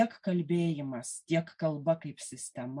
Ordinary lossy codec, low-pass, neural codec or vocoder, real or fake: MP3, 64 kbps; 10.8 kHz; none; real